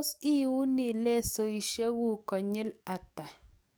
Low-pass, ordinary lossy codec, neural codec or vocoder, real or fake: none; none; codec, 44.1 kHz, 7.8 kbps, DAC; fake